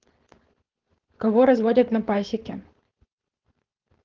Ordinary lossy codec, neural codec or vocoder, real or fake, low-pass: Opus, 16 kbps; codec, 16 kHz, 4.8 kbps, FACodec; fake; 7.2 kHz